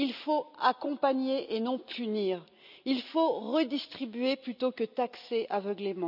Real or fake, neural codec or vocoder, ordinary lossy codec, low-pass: real; none; none; 5.4 kHz